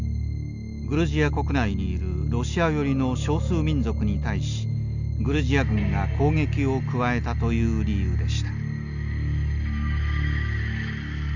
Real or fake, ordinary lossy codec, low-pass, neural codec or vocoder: real; none; 7.2 kHz; none